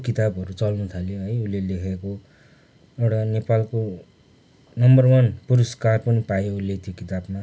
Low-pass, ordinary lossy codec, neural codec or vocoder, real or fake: none; none; none; real